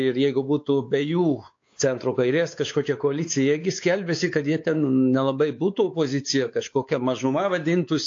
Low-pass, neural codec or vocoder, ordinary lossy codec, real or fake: 7.2 kHz; codec, 16 kHz, 4 kbps, X-Codec, WavLM features, trained on Multilingual LibriSpeech; AAC, 48 kbps; fake